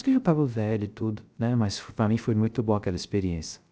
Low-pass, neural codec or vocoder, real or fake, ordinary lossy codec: none; codec, 16 kHz, 0.3 kbps, FocalCodec; fake; none